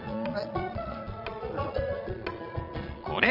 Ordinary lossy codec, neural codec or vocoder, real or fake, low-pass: none; vocoder, 22.05 kHz, 80 mel bands, Vocos; fake; 5.4 kHz